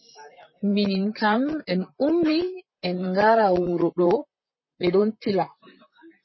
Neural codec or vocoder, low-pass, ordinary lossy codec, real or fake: vocoder, 44.1 kHz, 128 mel bands, Pupu-Vocoder; 7.2 kHz; MP3, 24 kbps; fake